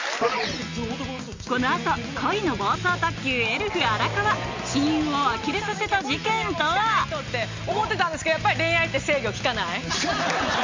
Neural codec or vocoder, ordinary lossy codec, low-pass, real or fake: none; MP3, 64 kbps; 7.2 kHz; real